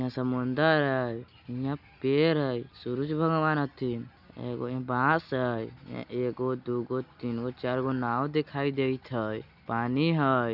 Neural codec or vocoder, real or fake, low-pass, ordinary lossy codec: none; real; 5.4 kHz; none